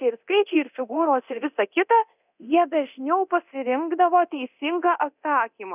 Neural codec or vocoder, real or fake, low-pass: codec, 24 kHz, 0.9 kbps, DualCodec; fake; 3.6 kHz